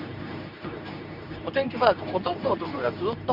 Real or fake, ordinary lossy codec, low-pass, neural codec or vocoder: fake; none; 5.4 kHz; codec, 24 kHz, 0.9 kbps, WavTokenizer, medium speech release version 2